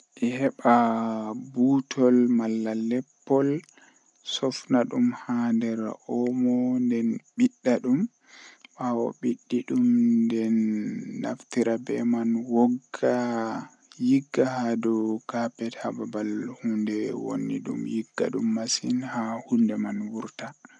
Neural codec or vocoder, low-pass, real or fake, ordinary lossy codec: none; 10.8 kHz; real; none